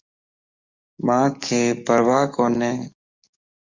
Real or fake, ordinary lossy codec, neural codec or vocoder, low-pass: fake; Opus, 64 kbps; codec, 44.1 kHz, 7.8 kbps, DAC; 7.2 kHz